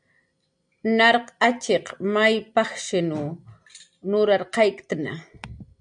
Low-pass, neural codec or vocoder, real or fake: 9.9 kHz; none; real